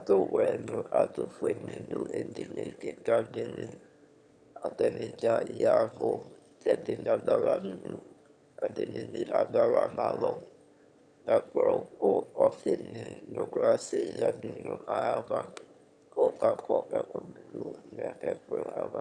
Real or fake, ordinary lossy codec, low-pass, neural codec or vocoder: fake; Opus, 64 kbps; 9.9 kHz; autoencoder, 22.05 kHz, a latent of 192 numbers a frame, VITS, trained on one speaker